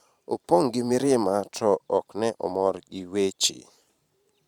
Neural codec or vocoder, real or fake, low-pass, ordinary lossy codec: vocoder, 44.1 kHz, 128 mel bands every 512 samples, BigVGAN v2; fake; 19.8 kHz; Opus, 64 kbps